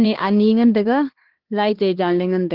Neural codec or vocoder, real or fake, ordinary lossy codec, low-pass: codec, 16 kHz, 2 kbps, X-Codec, HuBERT features, trained on LibriSpeech; fake; Opus, 16 kbps; 5.4 kHz